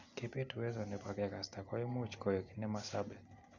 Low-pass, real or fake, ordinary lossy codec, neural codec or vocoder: 7.2 kHz; real; none; none